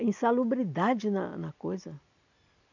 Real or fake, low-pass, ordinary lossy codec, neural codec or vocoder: real; 7.2 kHz; none; none